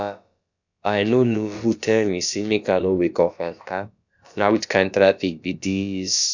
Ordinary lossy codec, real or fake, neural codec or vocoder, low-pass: none; fake; codec, 16 kHz, about 1 kbps, DyCAST, with the encoder's durations; 7.2 kHz